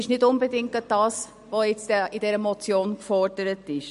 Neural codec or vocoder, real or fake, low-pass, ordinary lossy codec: none; real; 14.4 kHz; MP3, 48 kbps